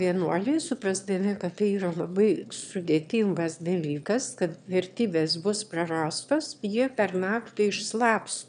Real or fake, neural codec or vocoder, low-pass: fake; autoencoder, 22.05 kHz, a latent of 192 numbers a frame, VITS, trained on one speaker; 9.9 kHz